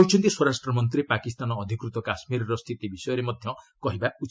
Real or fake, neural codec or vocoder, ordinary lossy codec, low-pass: real; none; none; none